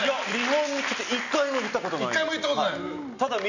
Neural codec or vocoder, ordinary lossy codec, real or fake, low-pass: none; none; real; 7.2 kHz